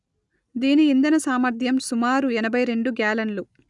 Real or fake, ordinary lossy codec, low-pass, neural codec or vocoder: real; none; 10.8 kHz; none